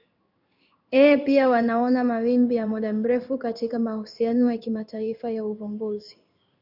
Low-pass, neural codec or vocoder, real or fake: 5.4 kHz; codec, 16 kHz in and 24 kHz out, 1 kbps, XY-Tokenizer; fake